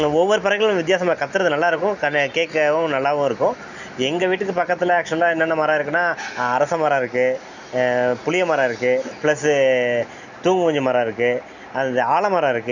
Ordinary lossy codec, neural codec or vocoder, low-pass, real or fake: none; none; 7.2 kHz; real